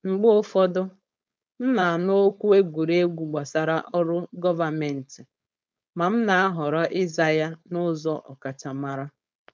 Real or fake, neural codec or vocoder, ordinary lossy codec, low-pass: fake; codec, 16 kHz, 4.8 kbps, FACodec; none; none